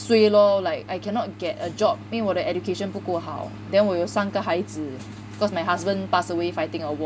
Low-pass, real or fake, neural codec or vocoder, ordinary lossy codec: none; real; none; none